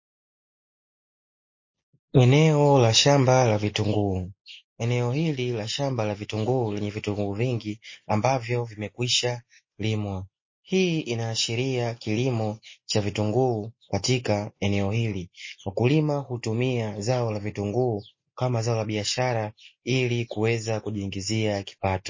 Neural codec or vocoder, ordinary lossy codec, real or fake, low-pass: none; MP3, 32 kbps; real; 7.2 kHz